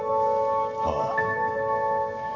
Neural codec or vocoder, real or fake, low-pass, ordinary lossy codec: none; real; 7.2 kHz; none